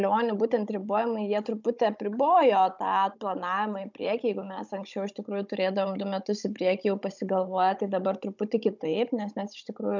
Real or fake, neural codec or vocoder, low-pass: fake; codec, 16 kHz, 16 kbps, FunCodec, trained on Chinese and English, 50 frames a second; 7.2 kHz